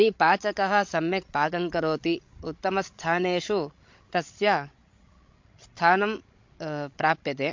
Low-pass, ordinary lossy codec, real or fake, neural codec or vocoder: 7.2 kHz; MP3, 48 kbps; fake; codec, 16 kHz, 16 kbps, FreqCodec, larger model